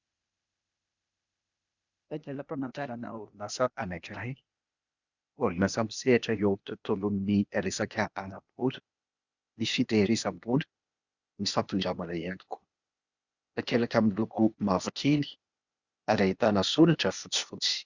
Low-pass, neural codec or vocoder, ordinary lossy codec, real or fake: 7.2 kHz; codec, 16 kHz, 0.8 kbps, ZipCodec; Opus, 64 kbps; fake